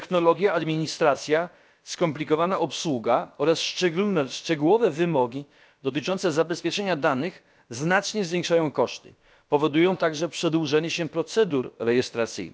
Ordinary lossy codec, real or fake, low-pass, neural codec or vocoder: none; fake; none; codec, 16 kHz, about 1 kbps, DyCAST, with the encoder's durations